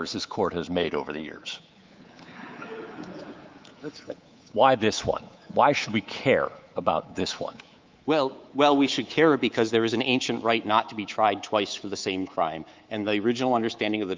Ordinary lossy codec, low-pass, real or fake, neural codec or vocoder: Opus, 32 kbps; 7.2 kHz; fake; codec, 24 kHz, 3.1 kbps, DualCodec